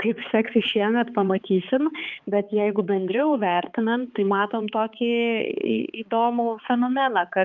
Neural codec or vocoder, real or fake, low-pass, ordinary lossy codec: codec, 16 kHz, 4 kbps, X-Codec, HuBERT features, trained on balanced general audio; fake; 7.2 kHz; Opus, 24 kbps